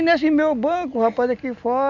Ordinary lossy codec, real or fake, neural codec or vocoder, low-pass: none; real; none; 7.2 kHz